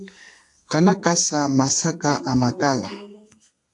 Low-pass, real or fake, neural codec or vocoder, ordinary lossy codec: 10.8 kHz; fake; codec, 32 kHz, 1.9 kbps, SNAC; AAC, 64 kbps